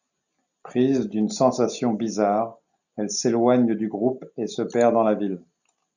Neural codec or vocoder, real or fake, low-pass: none; real; 7.2 kHz